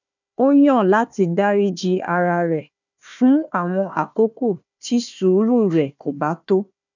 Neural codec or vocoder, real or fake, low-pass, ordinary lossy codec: codec, 16 kHz, 1 kbps, FunCodec, trained on Chinese and English, 50 frames a second; fake; 7.2 kHz; none